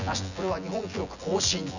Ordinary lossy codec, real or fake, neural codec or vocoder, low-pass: none; fake; vocoder, 24 kHz, 100 mel bands, Vocos; 7.2 kHz